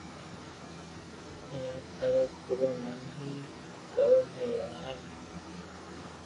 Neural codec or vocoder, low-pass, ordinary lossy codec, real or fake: codec, 44.1 kHz, 2.6 kbps, SNAC; 10.8 kHz; AAC, 32 kbps; fake